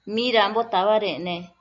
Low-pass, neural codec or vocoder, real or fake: 7.2 kHz; none; real